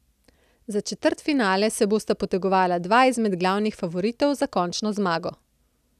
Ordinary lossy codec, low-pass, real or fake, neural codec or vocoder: none; 14.4 kHz; real; none